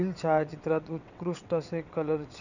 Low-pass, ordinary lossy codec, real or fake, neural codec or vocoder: 7.2 kHz; none; fake; vocoder, 22.05 kHz, 80 mel bands, WaveNeXt